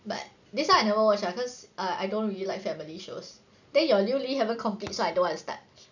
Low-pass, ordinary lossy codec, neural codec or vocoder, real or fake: 7.2 kHz; none; none; real